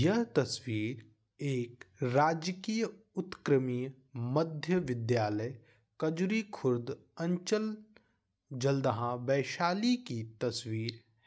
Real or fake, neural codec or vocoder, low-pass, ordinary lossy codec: real; none; none; none